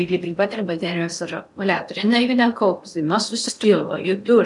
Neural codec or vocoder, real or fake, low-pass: codec, 16 kHz in and 24 kHz out, 0.6 kbps, FocalCodec, streaming, 4096 codes; fake; 10.8 kHz